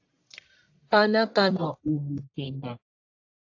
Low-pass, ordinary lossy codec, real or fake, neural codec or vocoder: 7.2 kHz; AAC, 48 kbps; fake; codec, 44.1 kHz, 1.7 kbps, Pupu-Codec